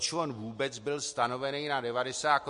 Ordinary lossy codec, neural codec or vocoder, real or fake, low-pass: MP3, 48 kbps; none; real; 14.4 kHz